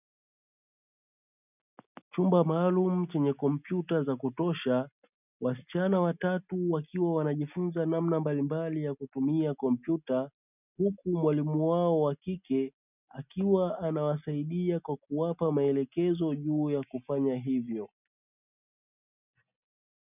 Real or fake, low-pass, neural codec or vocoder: real; 3.6 kHz; none